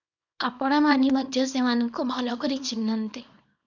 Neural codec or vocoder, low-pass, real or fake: codec, 24 kHz, 0.9 kbps, WavTokenizer, small release; 7.2 kHz; fake